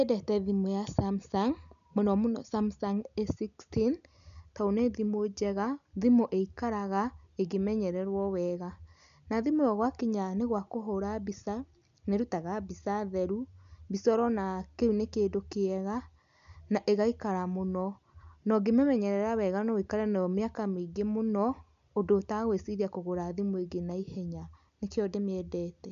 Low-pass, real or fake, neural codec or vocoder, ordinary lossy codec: 7.2 kHz; real; none; none